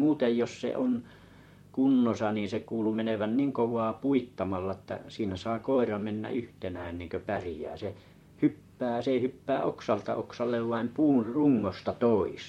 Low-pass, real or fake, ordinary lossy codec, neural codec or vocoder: 19.8 kHz; fake; MP3, 64 kbps; vocoder, 44.1 kHz, 128 mel bands, Pupu-Vocoder